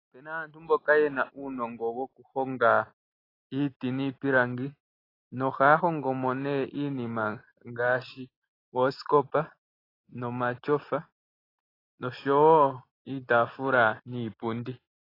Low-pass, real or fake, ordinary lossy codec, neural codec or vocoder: 5.4 kHz; real; AAC, 24 kbps; none